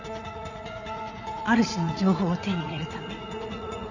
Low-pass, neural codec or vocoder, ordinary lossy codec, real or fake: 7.2 kHz; vocoder, 44.1 kHz, 80 mel bands, Vocos; none; fake